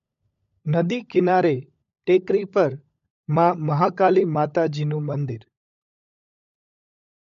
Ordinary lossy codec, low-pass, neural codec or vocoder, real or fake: MP3, 48 kbps; 7.2 kHz; codec, 16 kHz, 16 kbps, FunCodec, trained on LibriTTS, 50 frames a second; fake